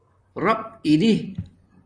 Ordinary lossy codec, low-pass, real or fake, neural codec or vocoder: AAC, 64 kbps; 9.9 kHz; fake; vocoder, 44.1 kHz, 128 mel bands, Pupu-Vocoder